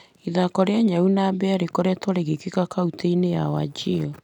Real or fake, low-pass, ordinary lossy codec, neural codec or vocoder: real; 19.8 kHz; none; none